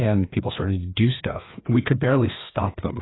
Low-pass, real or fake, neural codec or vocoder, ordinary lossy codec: 7.2 kHz; fake; codec, 16 kHz, 2 kbps, FreqCodec, larger model; AAC, 16 kbps